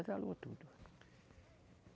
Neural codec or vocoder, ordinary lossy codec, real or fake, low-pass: none; none; real; none